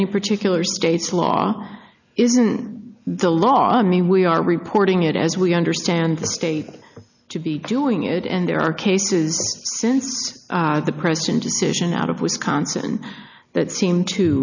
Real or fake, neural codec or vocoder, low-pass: real; none; 7.2 kHz